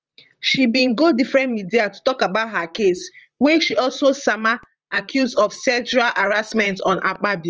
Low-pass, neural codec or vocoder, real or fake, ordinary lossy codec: 7.2 kHz; vocoder, 44.1 kHz, 128 mel bands, Pupu-Vocoder; fake; Opus, 24 kbps